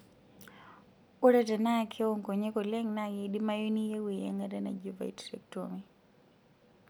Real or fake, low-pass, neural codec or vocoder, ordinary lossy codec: real; none; none; none